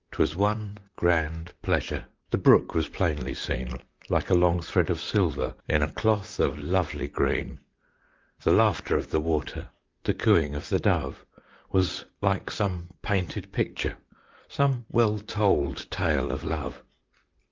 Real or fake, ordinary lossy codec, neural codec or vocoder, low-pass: fake; Opus, 24 kbps; vocoder, 44.1 kHz, 128 mel bands, Pupu-Vocoder; 7.2 kHz